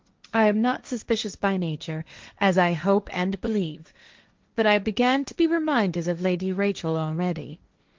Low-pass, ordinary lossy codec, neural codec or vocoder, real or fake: 7.2 kHz; Opus, 32 kbps; codec, 16 kHz, 1.1 kbps, Voila-Tokenizer; fake